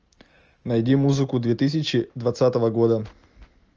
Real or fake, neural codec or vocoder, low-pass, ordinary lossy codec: real; none; 7.2 kHz; Opus, 24 kbps